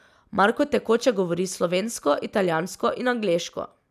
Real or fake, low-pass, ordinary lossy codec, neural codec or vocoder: real; 14.4 kHz; none; none